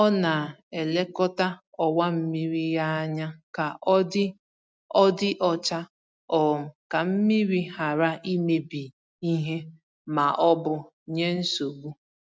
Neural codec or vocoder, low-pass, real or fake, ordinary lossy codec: none; none; real; none